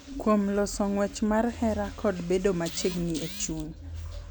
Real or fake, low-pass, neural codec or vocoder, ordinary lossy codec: real; none; none; none